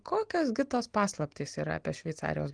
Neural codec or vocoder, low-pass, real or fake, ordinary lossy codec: none; 9.9 kHz; real; Opus, 24 kbps